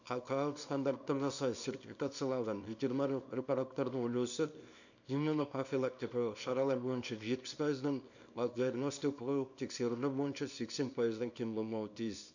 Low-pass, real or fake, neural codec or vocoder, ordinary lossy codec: 7.2 kHz; fake; codec, 24 kHz, 0.9 kbps, WavTokenizer, small release; none